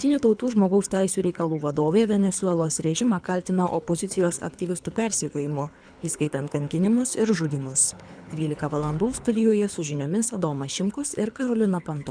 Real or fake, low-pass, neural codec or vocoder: fake; 9.9 kHz; codec, 24 kHz, 3 kbps, HILCodec